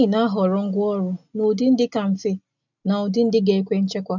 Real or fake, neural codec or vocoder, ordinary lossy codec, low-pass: real; none; none; 7.2 kHz